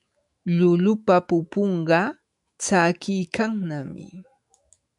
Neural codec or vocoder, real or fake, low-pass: autoencoder, 48 kHz, 128 numbers a frame, DAC-VAE, trained on Japanese speech; fake; 10.8 kHz